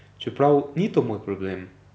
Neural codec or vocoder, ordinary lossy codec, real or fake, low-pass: none; none; real; none